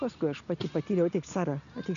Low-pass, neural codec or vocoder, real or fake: 7.2 kHz; none; real